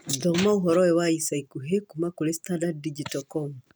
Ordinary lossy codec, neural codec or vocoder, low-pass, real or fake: none; none; none; real